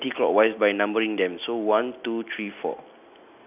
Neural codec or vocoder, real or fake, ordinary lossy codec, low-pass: none; real; none; 3.6 kHz